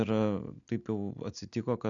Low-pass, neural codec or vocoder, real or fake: 7.2 kHz; none; real